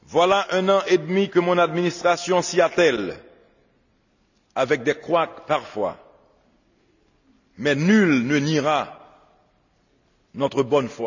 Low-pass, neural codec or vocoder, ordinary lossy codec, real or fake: 7.2 kHz; none; none; real